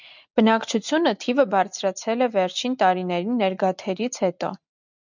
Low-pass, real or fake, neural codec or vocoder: 7.2 kHz; real; none